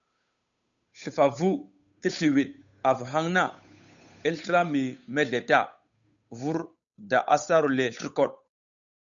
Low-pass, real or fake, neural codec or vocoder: 7.2 kHz; fake; codec, 16 kHz, 8 kbps, FunCodec, trained on Chinese and English, 25 frames a second